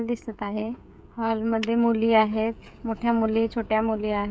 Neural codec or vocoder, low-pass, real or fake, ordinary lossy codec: codec, 16 kHz, 16 kbps, FreqCodec, smaller model; none; fake; none